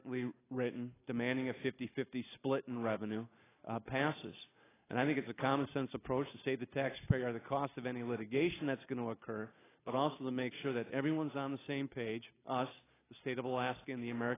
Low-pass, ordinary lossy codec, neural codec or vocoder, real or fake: 3.6 kHz; AAC, 16 kbps; none; real